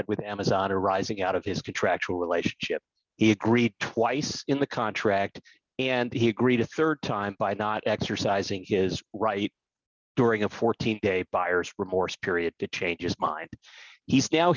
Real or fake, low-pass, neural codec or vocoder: real; 7.2 kHz; none